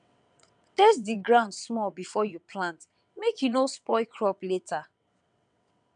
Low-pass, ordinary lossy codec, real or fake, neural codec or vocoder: 9.9 kHz; none; fake; vocoder, 22.05 kHz, 80 mel bands, WaveNeXt